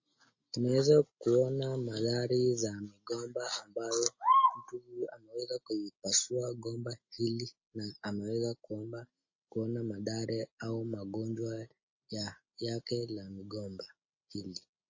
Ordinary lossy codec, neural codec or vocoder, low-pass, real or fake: MP3, 32 kbps; none; 7.2 kHz; real